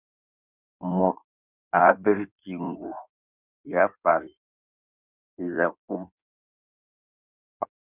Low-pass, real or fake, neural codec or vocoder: 3.6 kHz; fake; codec, 16 kHz in and 24 kHz out, 1.1 kbps, FireRedTTS-2 codec